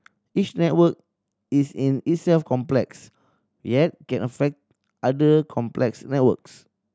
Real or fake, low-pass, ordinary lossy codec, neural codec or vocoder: real; none; none; none